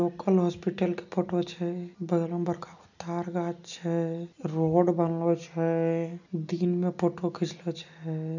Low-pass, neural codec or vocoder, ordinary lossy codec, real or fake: 7.2 kHz; none; none; real